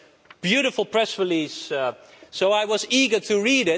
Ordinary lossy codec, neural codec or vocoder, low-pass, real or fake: none; none; none; real